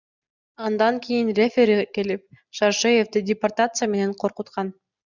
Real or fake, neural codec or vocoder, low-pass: real; none; 7.2 kHz